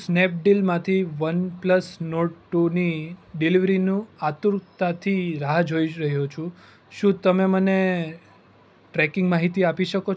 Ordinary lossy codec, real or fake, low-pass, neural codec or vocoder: none; real; none; none